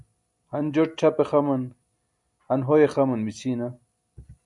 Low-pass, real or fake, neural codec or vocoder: 10.8 kHz; real; none